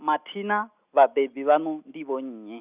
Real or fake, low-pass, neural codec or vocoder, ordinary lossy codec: real; 3.6 kHz; none; Opus, 64 kbps